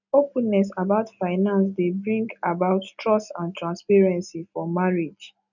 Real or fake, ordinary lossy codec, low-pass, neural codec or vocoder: real; none; 7.2 kHz; none